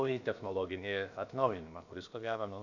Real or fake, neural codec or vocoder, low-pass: fake; codec, 16 kHz, about 1 kbps, DyCAST, with the encoder's durations; 7.2 kHz